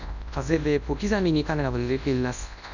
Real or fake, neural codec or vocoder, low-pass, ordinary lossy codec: fake; codec, 24 kHz, 0.9 kbps, WavTokenizer, large speech release; 7.2 kHz; none